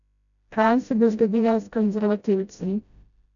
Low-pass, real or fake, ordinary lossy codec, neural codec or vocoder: 7.2 kHz; fake; none; codec, 16 kHz, 0.5 kbps, FreqCodec, smaller model